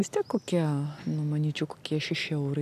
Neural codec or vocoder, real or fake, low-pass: none; real; 14.4 kHz